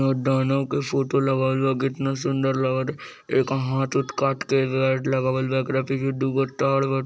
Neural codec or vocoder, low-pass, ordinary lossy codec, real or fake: none; none; none; real